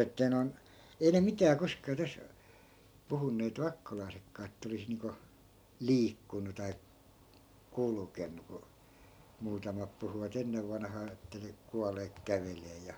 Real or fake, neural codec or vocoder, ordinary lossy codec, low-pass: real; none; none; none